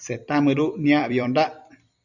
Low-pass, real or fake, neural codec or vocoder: 7.2 kHz; fake; vocoder, 44.1 kHz, 128 mel bands every 256 samples, BigVGAN v2